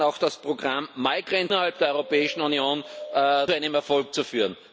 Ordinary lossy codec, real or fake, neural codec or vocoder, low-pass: none; real; none; none